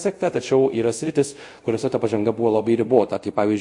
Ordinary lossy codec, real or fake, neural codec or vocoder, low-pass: MP3, 48 kbps; fake; codec, 24 kHz, 0.5 kbps, DualCodec; 10.8 kHz